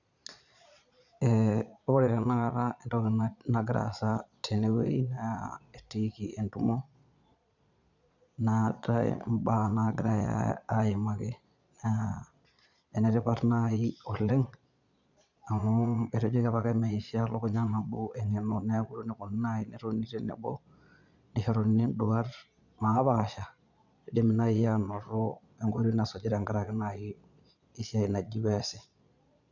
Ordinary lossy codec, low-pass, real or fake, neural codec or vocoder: none; 7.2 kHz; fake; vocoder, 22.05 kHz, 80 mel bands, Vocos